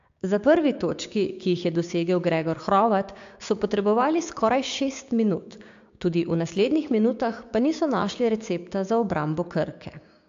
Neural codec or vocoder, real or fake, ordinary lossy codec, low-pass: codec, 16 kHz, 6 kbps, DAC; fake; AAC, 64 kbps; 7.2 kHz